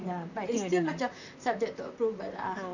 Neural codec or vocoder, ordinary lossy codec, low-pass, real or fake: vocoder, 44.1 kHz, 128 mel bands, Pupu-Vocoder; none; 7.2 kHz; fake